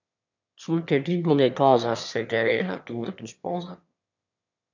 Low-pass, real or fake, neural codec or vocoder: 7.2 kHz; fake; autoencoder, 22.05 kHz, a latent of 192 numbers a frame, VITS, trained on one speaker